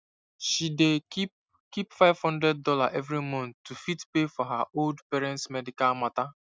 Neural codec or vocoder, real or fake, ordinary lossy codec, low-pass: none; real; none; none